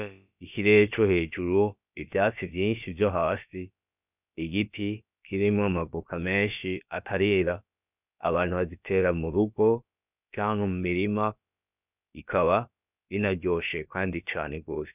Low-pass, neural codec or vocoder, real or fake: 3.6 kHz; codec, 16 kHz, about 1 kbps, DyCAST, with the encoder's durations; fake